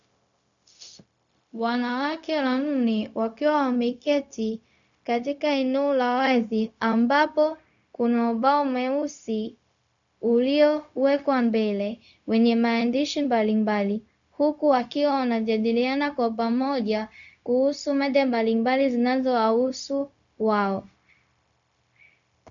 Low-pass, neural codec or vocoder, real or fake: 7.2 kHz; codec, 16 kHz, 0.4 kbps, LongCat-Audio-Codec; fake